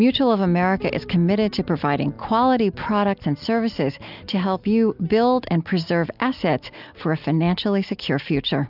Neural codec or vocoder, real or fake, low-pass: none; real; 5.4 kHz